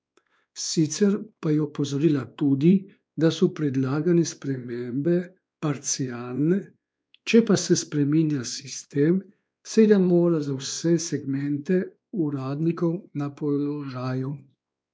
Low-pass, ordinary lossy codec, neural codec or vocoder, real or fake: none; none; codec, 16 kHz, 2 kbps, X-Codec, WavLM features, trained on Multilingual LibriSpeech; fake